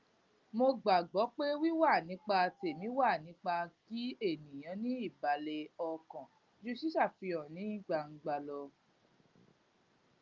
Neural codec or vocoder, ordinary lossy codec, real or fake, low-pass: none; Opus, 24 kbps; real; 7.2 kHz